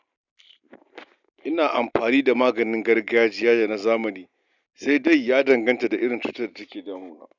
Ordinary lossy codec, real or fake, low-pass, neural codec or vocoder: none; real; 7.2 kHz; none